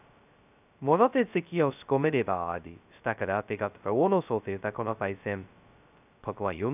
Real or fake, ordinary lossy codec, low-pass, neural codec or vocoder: fake; none; 3.6 kHz; codec, 16 kHz, 0.2 kbps, FocalCodec